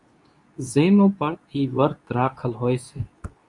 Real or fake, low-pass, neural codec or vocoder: fake; 10.8 kHz; codec, 24 kHz, 0.9 kbps, WavTokenizer, medium speech release version 2